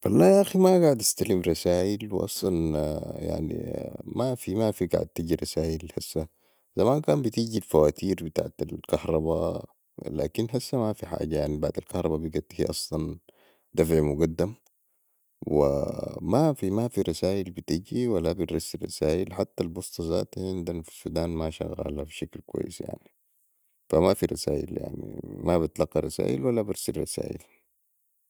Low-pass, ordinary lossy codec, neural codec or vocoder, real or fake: none; none; none; real